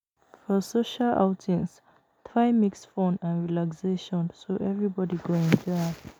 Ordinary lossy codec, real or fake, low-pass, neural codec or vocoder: none; real; none; none